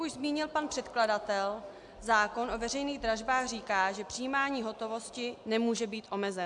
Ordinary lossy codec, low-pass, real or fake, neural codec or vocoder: AAC, 64 kbps; 10.8 kHz; real; none